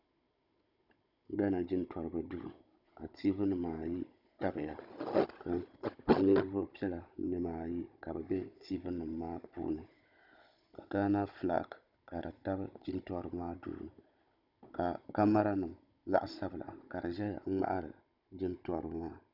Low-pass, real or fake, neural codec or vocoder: 5.4 kHz; fake; codec, 16 kHz, 16 kbps, FunCodec, trained on Chinese and English, 50 frames a second